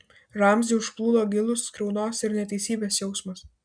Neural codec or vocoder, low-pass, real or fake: none; 9.9 kHz; real